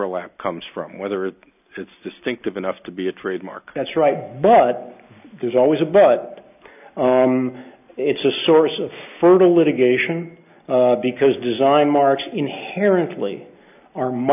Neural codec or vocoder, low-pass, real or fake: none; 3.6 kHz; real